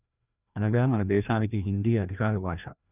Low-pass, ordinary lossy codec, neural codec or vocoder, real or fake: 3.6 kHz; none; codec, 16 kHz, 1 kbps, FreqCodec, larger model; fake